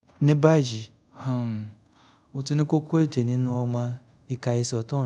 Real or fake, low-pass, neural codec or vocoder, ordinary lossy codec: fake; 10.8 kHz; codec, 24 kHz, 0.5 kbps, DualCodec; none